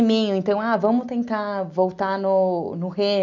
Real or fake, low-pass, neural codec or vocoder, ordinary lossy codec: real; 7.2 kHz; none; none